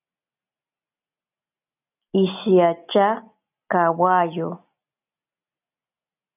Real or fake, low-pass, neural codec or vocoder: real; 3.6 kHz; none